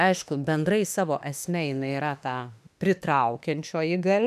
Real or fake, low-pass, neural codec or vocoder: fake; 14.4 kHz; autoencoder, 48 kHz, 32 numbers a frame, DAC-VAE, trained on Japanese speech